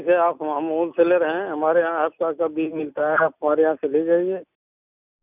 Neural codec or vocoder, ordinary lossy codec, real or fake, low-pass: none; none; real; 3.6 kHz